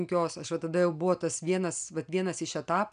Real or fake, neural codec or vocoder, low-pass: real; none; 9.9 kHz